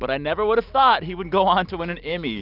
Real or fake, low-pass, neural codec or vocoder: real; 5.4 kHz; none